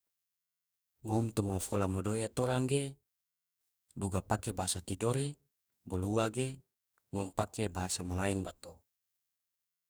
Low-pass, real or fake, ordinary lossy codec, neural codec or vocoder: none; fake; none; codec, 44.1 kHz, 2.6 kbps, DAC